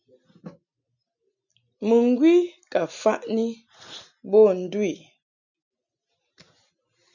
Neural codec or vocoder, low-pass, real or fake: none; 7.2 kHz; real